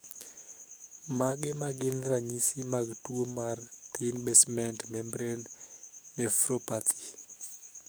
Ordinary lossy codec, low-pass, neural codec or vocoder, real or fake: none; none; codec, 44.1 kHz, 7.8 kbps, DAC; fake